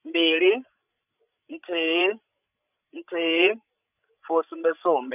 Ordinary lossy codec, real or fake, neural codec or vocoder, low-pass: none; fake; codec, 16 kHz, 16 kbps, FreqCodec, larger model; 3.6 kHz